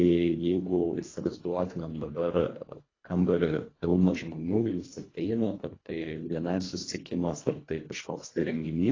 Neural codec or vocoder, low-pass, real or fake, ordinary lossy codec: codec, 24 kHz, 1.5 kbps, HILCodec; 7.2 kHz; fake; AAC, 32 kbps